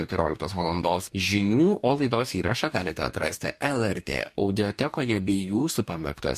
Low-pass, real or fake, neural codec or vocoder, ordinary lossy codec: 14.4 kHz; fake; codec, 44.1 kHz, 2.6 kbps, DAC; MP3, 64 kbps